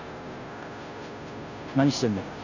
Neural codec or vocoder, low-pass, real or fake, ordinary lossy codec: codec, 16 kHz, 0.5 kbps, FunCodec, trained on Chinese and English, 25 frames a second; 7.2 kHz; fake; none